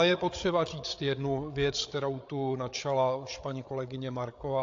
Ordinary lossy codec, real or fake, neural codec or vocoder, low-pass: AAC, 48 kbps; fake; codec, 16 kHz, 8 kbps, FreqCodec, larger model; 7.2 kHz